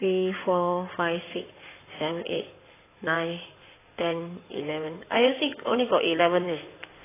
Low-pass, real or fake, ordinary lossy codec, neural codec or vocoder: 3.6 kHz; fake; AAC, 16 kbps; codec, 16 kHz in and 24 kHz out, 2.2 kbps, FireRedTTS-2 codec